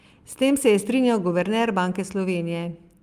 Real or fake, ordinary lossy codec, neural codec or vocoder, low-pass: real; Opus, 32 kbps; none; 14.4 kHz